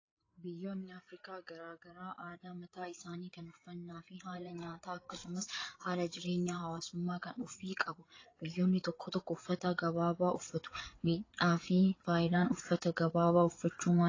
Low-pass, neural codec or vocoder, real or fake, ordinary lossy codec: 7.2 kHz; codec, 16 kHz, 8 kbps, FreqCodec, larger model; fake; AAC, 32 kbps